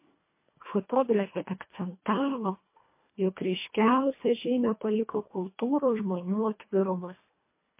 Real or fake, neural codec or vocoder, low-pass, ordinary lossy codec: fake; codec, 24 kHz, 1.5 kbps, HILCodec; 3.6 kHz; MP3, 24 kbps